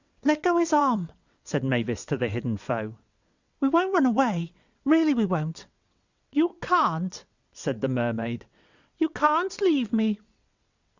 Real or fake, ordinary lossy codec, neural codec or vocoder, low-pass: fake; Opus, 64 kbps; vocoder, 22.05 kHz, 80 mel bands, WaveNeXt; 7.2 kHz